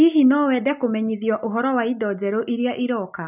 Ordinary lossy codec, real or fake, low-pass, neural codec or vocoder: none; real; 3.6 kHz; none